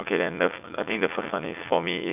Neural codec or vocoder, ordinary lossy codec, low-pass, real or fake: vocoder, 44.1 kHz, 80 mel bands, Vocos; none; 3.6 kHz; fake